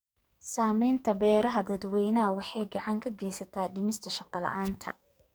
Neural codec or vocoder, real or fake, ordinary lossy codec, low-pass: codec, 44.1 kHz, 2.6 kbps, SNAC; fake; none; none